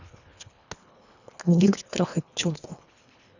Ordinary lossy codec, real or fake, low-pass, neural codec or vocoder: none; fake; 7.2 kHz; codec, 24 kHz, 1.5 kbps, HILCodec